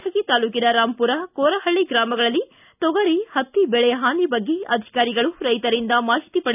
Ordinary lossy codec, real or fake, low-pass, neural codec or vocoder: none; real; 3.6 kHz; none